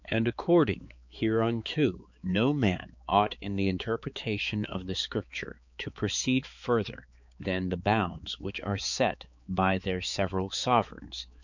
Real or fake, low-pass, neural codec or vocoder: fake; 7.2 kHz; codec, 16 kHz, 4 kbps, X-Codec, HuBERT features, trained on balanced general audio